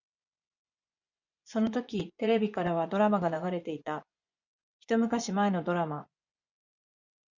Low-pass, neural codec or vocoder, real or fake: 7.2 kHz; vocoder, 24 kHz, 100 mel bands, Vocos; fake